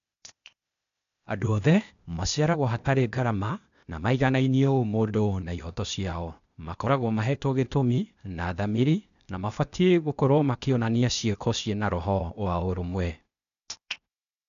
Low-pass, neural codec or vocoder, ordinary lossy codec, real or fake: 7.2 kHz; codec, 16 kHz, 0.8 kbps, ZipCodec; none; fake